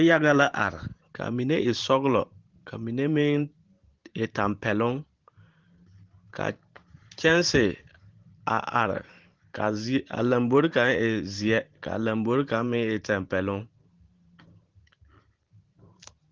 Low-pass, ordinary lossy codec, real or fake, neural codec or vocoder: 7.2 kHz; Opus, 16 kbps; fake; vocoder, 44.1 kHz, 128 mel bands every 512 samples, BigVGAN v2